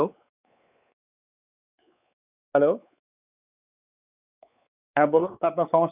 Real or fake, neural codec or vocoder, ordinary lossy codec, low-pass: fake; codec, 16 kHz, 4 kbps, X-Codec, WavLM features, trained on Multilingual LibriSpeech; none; 3.6 kHz